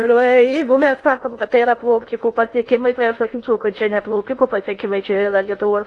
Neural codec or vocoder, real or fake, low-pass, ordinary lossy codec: codec, 16 kHz in and 24 kHz out, 0.6 kbps, FocalCodec, streaming, 2048 codes; fake; 10.8 kHz; AAC, 48 kbps